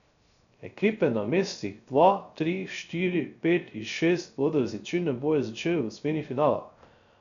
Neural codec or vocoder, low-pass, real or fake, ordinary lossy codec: codec, 16 kHz, 0.3 kbps, FocalCodec; 7.2 kHz; fake; none